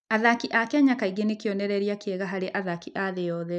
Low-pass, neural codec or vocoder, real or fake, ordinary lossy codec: 10.8 kHz; none; real; none